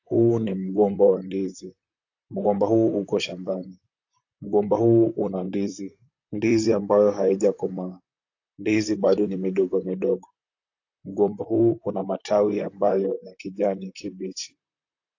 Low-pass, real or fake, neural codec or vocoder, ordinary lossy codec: 7.2 kHz; fake; vocoder, 44.1 kHz, 128 mel bands, Pupu-Vocoder; AAC, 48 kbps